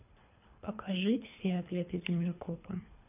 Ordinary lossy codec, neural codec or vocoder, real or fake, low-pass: none; codec, 24 kHz, 3 kbps, HILCodec; fake; 3.6 kHz